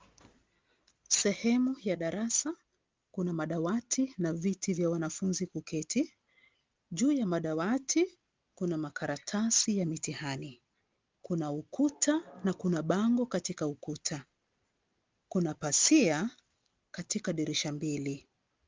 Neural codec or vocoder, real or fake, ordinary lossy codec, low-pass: none; real; Opus, 32 kbps; 7.2 kHz